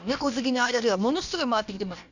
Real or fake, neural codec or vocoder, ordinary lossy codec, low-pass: fake; codec, 16 kHz, about 1 kbps, DyCAST, with the encoder's durations; none; 7.2 kHz